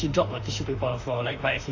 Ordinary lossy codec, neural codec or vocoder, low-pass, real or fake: AAC, 32 kbps; autoencoder, 48 kHz, 32 numbers a frame, DAC-VAE, trained on Japanese speech; 7.2 kHz; fake